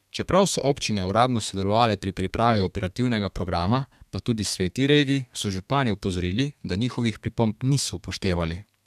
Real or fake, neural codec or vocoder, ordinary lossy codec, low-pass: fake; codec, 32 kHz, 1.9 kbps, SNAC; none; 14.4 kHz